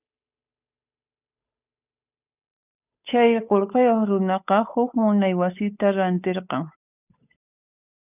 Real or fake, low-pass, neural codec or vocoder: fake; 3.6 kHz; codec, 16 kHz, 8 kbps, FunCodec, trained on Chinese and English, 25 frames a second